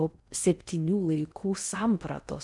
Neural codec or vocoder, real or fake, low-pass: codec, 16 kHz in and 24 kHz out, 0.6 kbps, FocalCodec, streaming, 4096 codes; fake; 10.8 kHz